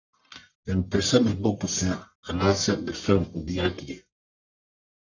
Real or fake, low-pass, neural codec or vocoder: fake; 7.2 kHz; codec, 44.1 kHz, 1.7 kbps, Pupu-Codec